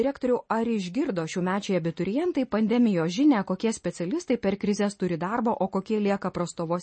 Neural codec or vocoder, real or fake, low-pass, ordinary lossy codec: none; real; 9.9 kHz; MP3, 32 kbps